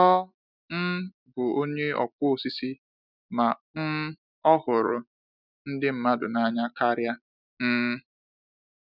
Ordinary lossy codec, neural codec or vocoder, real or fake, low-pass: none; none; real; 5.4 kHz